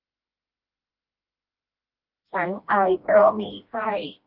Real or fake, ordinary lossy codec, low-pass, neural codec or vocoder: fake; Opus, 24 kbps; 5.4 kHz; codec, 16 kHz, 1 kbps, FreqCodec, smaller model